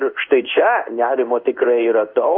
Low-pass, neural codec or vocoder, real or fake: 5.4 kHz; codec, 16 kHz in and 24 kHz out, 1 kbps, XY-Tokenizer; fake